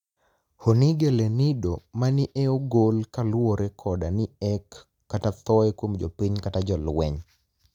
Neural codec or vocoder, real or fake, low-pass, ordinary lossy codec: none; real; 19.8 kHz; none